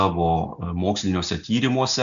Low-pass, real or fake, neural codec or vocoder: 7.2 kHz; real; none